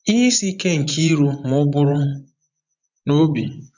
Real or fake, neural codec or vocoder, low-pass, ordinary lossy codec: fake; vocoder, 24 kHz, 100 mel bands, Vocos; 7.2 kHz; none